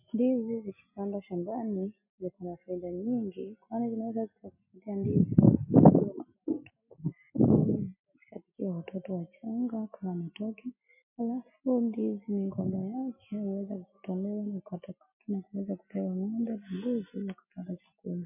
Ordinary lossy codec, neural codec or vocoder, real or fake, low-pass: MP3, 32 kbps; none; real; 3.6 kHz